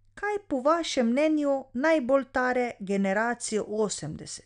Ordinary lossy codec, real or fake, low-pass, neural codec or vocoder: none; real; 9.9 kHz; none